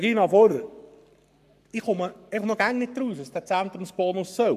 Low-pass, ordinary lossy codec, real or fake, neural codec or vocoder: 14.4 kHz; none; fake; codec, 44.1 kHz, 7.8 kbps, Pupu-Codec